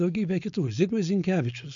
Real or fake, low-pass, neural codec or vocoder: fake; 7.2 kHz; codec, 16 kHz, 4.8 kbps, FACodec